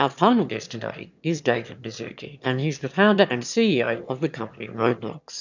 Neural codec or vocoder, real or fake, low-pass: autoencoder, 22.05 kHz, a latent of 192 numbers a frame, VITS, trained on one speaker; fake; 7.2 kHz